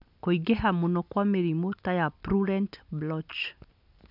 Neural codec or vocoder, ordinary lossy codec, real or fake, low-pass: none; none; real; 5.4 kHz